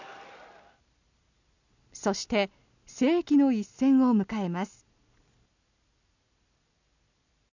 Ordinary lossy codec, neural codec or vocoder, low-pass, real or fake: none; none; 7.2 kHz; real